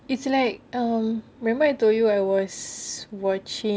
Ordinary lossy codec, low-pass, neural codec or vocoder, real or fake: none; none; none; real